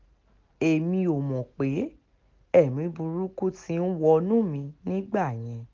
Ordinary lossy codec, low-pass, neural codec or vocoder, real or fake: Opus, 32 kbps; 7.2 kHz; none; real